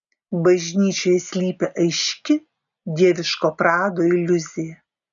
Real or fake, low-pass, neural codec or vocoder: real; 7.2 kHz; none